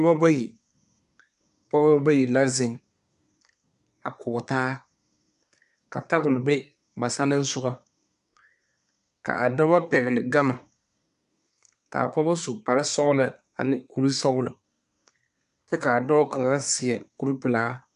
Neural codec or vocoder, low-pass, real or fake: codec, 24 kHz, 1 kbps, SNAC; 10.8 kHz; fake